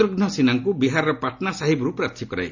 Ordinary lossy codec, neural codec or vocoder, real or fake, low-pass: none; none; real; 7.2 kHz